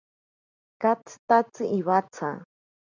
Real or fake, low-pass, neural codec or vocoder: real; 7.2 kHz; none